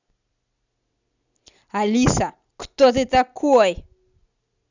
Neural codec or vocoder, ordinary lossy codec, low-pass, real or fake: none; none; 7.2 kHz; real